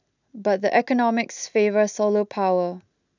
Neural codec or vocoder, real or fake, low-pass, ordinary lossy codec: none; real; 7.2 kHz; none